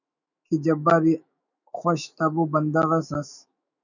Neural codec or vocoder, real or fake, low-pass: autoencoder, 48 kHz, 128 numbers a frame, DAC-VAE, trained on Japanese speech; fake; 7.2 kHz